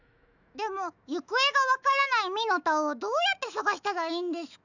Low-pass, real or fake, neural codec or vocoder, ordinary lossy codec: 7.2 kHz; fake; autoencoder, 48 kHz, 128 numbers a frame, DAC-VAE, trained on Japanese speech; none